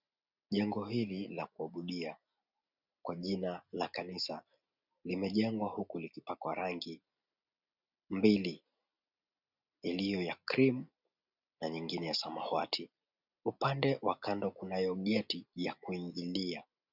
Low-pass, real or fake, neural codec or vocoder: 5.4 kHz; real; none